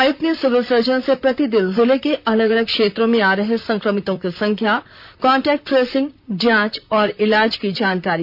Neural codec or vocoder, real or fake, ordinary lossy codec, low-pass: vocoder, 44.1 kHz, 128 mel bands, Pupu-Vocoder; fake; none; 5.4 kHz